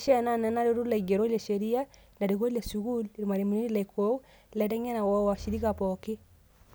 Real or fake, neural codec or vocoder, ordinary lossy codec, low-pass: real; none; none; none